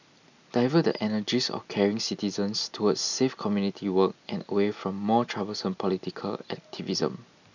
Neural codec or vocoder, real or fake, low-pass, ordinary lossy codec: none; real; 7.2 kHz; none